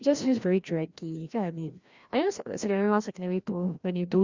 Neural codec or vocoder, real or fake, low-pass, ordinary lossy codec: codec, 16 kHz, 1 kbps, FreqCodec, larger model; fake; 7.2 kHz; Opus, 64 kbps